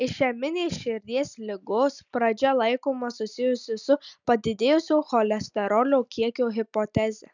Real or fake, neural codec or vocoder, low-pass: real; none; 7.2 kHz